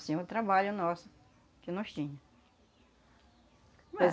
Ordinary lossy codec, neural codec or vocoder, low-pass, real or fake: none; none; none; real